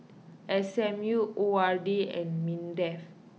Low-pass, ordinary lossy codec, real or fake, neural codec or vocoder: none; none; real; none